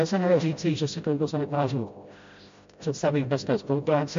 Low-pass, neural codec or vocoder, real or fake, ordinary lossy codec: 7.2 kHz; codec, 16 kHz, 0.5 kbps, FreqCodec, smaller model; fake; MP3, 48 kbps